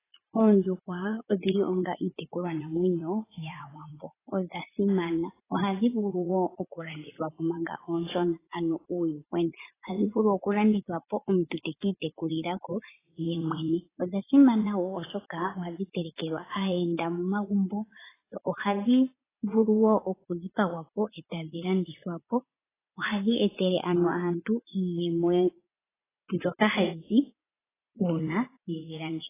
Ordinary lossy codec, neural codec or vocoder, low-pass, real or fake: AAC, 16 kbps; vocoder, 44.1 kHz, 80 mel bands, Vocos; 3.6 kHz; fake